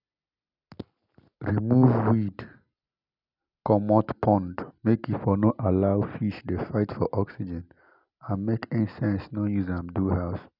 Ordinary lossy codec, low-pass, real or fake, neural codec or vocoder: none; 5.4 kHz; real; none